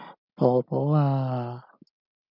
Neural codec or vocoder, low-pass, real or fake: none; 5.4 kHz; real